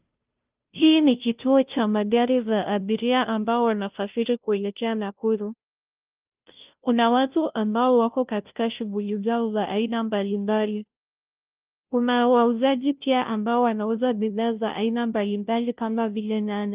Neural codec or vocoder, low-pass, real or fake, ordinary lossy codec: codec, 16 kHz, 0.5 kbps, FunCodec, trained on Chinese and English, 25 frames a second; 3.6 kHz; fake; Opus, 32 kbps